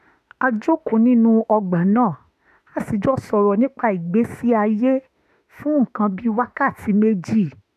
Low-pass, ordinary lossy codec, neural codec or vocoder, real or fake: 14.4 kHz; none; autoencoder, 48 kHz, 32 numbers a frame, DAC-VAE, trained on Japanese speech; fake